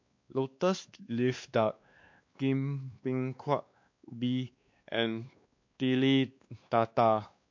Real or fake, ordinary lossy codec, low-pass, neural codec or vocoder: fake; MP3, 48 kbps; 7.2 kHz; codec, 16 kHz, 2 kbps, X-Codec, WavLM features, trained on Multilingual LibriSpeech